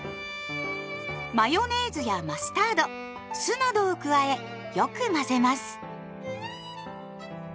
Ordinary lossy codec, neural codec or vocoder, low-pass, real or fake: none; none; none; real